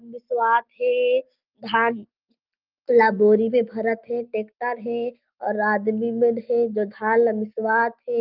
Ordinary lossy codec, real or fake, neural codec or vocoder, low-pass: Opus, 24 kbps; real; none; 5.4 kHz